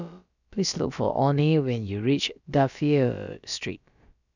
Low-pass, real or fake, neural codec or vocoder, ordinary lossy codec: 7.2 kHz; fake; codec, 16 kHz, about 1 kbps, DyCAST, with the encoder's durations; none